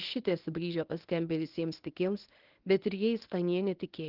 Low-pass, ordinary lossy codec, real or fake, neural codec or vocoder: 5.4 kHz; Opus, 16 kbps; fake; codec, 24 kHz, 0.9 kbps, WavTokenizer, medium speech release version 1